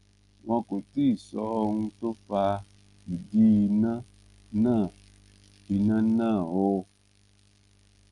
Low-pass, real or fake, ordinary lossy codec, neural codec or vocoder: 10.8 kHz; real; Opus, 32 kbps; none